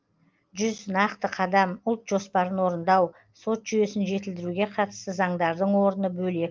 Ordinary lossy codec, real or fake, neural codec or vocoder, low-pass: Opus, 32 kbps; real; none; 7.2 kHz